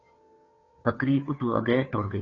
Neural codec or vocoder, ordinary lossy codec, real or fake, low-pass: codec, 16 kHz, 2 kbps, FunCodec, trained on Chinese and English, 25 frames a second; AAC, 32 kbps; fake; 7.2 kHz